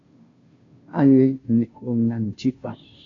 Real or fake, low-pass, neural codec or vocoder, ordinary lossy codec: fake; 7.2 kHz; codec, 16 kHz, 0.5 kbps, FunCodec, trained on Chinese and English, 25 frames a second; AAC, 48 kbps